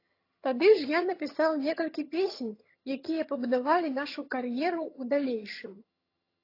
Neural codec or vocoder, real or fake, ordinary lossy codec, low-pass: vocoder, 22.05 kHz, 80 mel bands, HiFi-GAN; fake; AAC, 32 kbps; 5.4 kHz